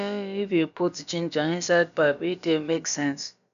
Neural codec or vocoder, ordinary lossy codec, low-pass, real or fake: codec, 16 kHz, about 1 kbps, DyCAST, with the encoder's durations; MP3, 96 kbps; 7.2 kHz; fake